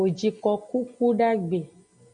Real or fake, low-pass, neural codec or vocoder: real; 9.9 kHz; none